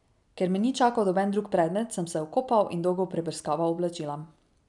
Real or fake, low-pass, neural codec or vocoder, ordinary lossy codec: real; 10.8 kHz; none; none